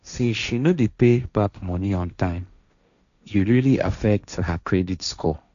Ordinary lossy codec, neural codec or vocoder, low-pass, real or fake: none; codec, 16 kHz, 1.1 kbps, Voila-Tokenizer; 7.2 kHz; fake